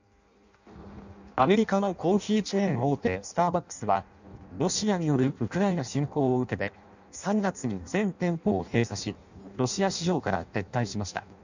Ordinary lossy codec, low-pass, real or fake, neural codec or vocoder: none; 7.2 kHz; fake; codec, 16 kHz in and 24 kHz out, 0.6 kbps, FireRedTTS-2 codec